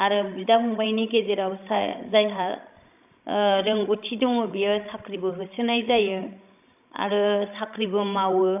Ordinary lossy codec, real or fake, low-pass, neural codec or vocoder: AAC, 32 kbps; fake; 3.6 kHz; codec, 16 kHz, 16 kbps, FreqCodec, larger model